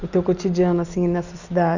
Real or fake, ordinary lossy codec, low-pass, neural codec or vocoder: real; none; 7.2 kHz; none